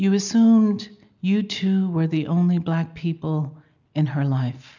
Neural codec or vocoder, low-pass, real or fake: none; 7.2 kHz; real